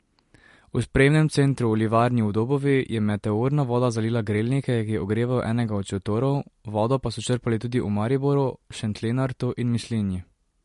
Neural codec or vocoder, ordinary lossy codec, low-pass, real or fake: none; MP3, 48 kbps; 14.4 kHz; real